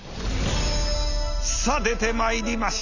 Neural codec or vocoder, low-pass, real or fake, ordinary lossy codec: none; 7.2 kHz; real; none